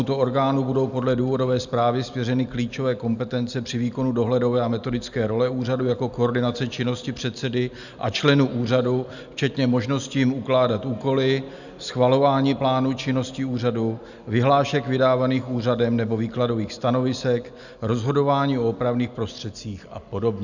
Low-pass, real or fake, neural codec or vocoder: 7.2 kHz; real; none